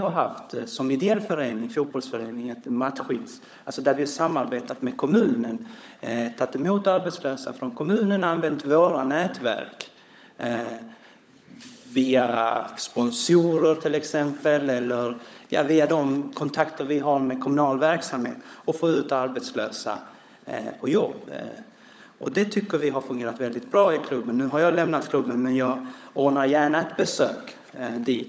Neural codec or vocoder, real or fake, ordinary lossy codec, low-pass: codec, 16 kHz, 16 kbps, FunCodec, trained on LibriTTS, 50 frames a second; fake; none; none